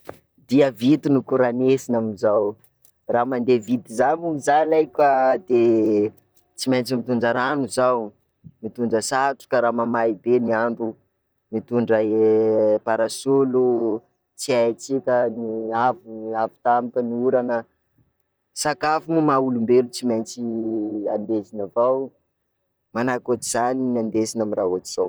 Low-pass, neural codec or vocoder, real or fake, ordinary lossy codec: none; vocoder, 44.1 kHz, 128 mel bands, Pupu-Vocoder; fake; none